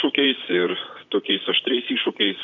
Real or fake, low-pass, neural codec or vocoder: fake; 7.2 kHz; vocoder, 44.1 kHz, 128 mel bands, Pupu-Vocoder